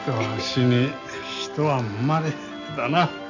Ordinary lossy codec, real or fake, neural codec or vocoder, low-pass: none; real; none; 7.2 kHz